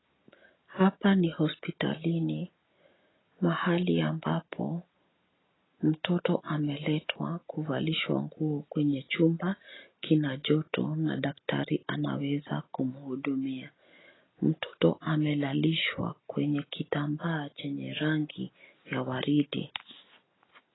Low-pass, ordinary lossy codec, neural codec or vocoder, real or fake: 7.2 kHz; AAC, 16 kbps; none; real